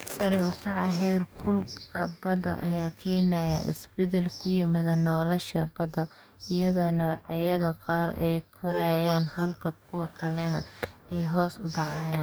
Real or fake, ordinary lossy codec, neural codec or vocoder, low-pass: fake; none; codec, 44.1 kHz, 2.6 kbps, DAC; none